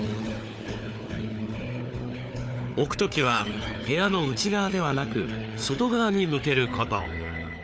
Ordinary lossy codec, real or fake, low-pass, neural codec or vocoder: none; fake; none; codec, 16 kHz, 4 kbps, FunCodec, trained on Chinese and English, 50 frames a second